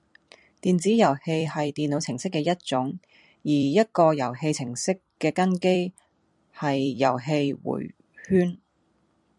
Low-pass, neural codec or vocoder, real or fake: 10.8 kHz; vocoder, 44.1 kHz, 128 mel bands every 256 samples, BigVGAN v2; fake